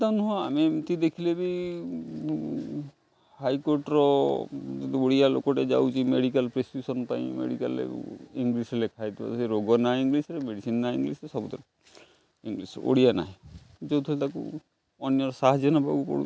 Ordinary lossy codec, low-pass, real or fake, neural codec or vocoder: none; none; real; none